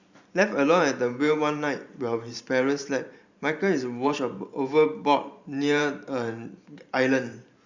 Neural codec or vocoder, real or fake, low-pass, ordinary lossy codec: vocoder, 44.1 kHz, 128 mel bands every 512 samples, BigVGAN v2; fake; 7.2 kHz; Opus, 64 kbps